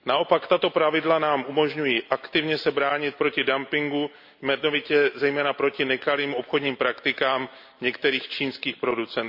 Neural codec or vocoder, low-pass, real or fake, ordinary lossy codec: none; 5.4 kHz; real; MP3, 48 kbps